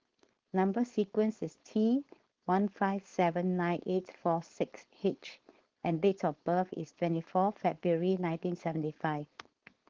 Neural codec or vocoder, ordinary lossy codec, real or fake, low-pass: codec, 16 kHz, 4.8 kbps, FACodec; Opus, 16 kbps; fake; 7.2 kHz